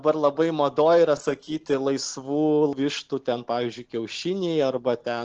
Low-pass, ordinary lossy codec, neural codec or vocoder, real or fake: 7.2 kHz; Opus, 16 kbps; none; real